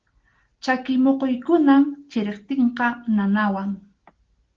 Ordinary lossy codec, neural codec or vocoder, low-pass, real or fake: Opus, 16 kbps; none; 7.2 kHz; real